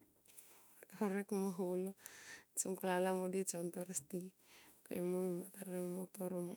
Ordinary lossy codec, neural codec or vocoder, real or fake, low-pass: none; autoencoder, 48 kHz, 32 numbers a frame, DAC-VAE, trained on Japanese speech; fake; none